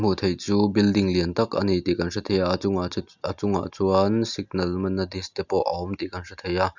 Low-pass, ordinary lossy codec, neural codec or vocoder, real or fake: 7.2 kHz; none; none; real